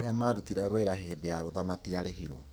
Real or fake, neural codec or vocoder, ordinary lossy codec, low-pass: fake; codec, 44.1 kHz, 2.6 kbps, SNAC; none; none